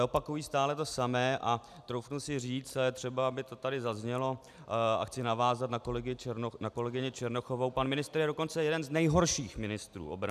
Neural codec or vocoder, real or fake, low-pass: none; real; 14.4 kHz